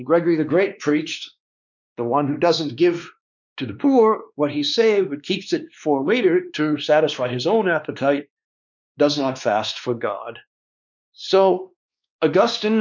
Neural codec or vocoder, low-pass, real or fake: codec, 16 kHz, 2 kbps, X-Codec, WavLM features, trained on Multilingual LibriSpeech; 7.2 kHz; fake